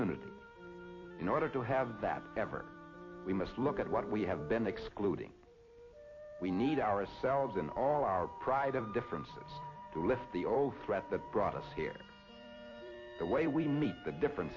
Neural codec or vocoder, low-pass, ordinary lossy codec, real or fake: vocoder, 44.1 kHz, 128 mel bands every 256 samples, BigVGAN v2; 7.2 kHz; MP3, 32 kbps; fake